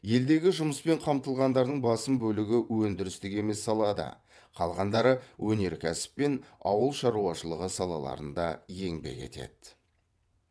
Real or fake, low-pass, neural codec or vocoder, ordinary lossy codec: fake; none; vocoder, 22.05 kHz, 80 mel bands, WaveNeXt; none